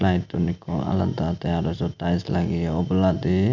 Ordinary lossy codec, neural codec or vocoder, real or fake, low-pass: none; none; real; 7.2 kHz